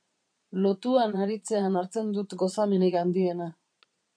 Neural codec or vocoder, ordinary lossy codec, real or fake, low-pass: vocoder, 22.05 kHz, 80 mel bands, Vocos; MP3, 96 kbps; fake; 9.9 kHz